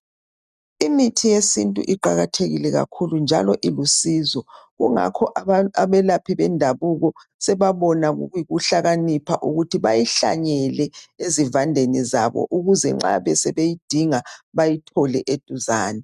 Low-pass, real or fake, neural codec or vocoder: 14.4 kHz; real; none